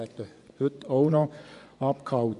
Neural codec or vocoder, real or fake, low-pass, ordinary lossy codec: vocoder, 24 kHz, 100 mel bands, Vocos; fake; 10.8 kHz; none